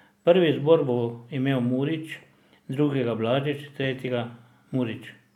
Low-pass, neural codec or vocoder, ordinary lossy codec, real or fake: 19.8 kHz; none; none; real